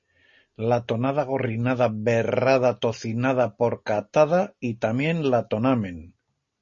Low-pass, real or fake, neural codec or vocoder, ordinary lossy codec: 7.2 kHz; real; none; MP3, 32 kbps